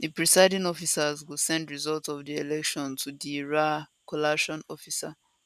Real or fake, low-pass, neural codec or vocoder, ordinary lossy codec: real; 14.4 kHz; none; none